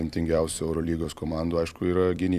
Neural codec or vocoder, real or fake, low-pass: vocoder, 44.1 kHz, 128 mel bands every 256 samples, BigVGAN v2; fake; 14.4 kHz